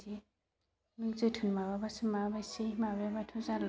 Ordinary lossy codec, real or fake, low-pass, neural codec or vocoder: none; real; none; none